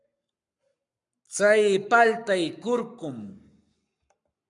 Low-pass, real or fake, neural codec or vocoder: 10.8 kHz; fake; codec, 44.1 kHz, 7.8 kbps, Pupu-Codec